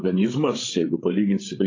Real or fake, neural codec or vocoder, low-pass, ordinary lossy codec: fake; codec, 16 kHz, 8 kbps, FreqCodec, larger model; 7.2 kHz; AAC, 32 kbps